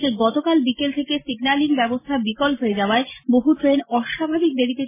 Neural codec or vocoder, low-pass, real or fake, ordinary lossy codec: none; 3.6 kHz; real; MP3, 16 kbps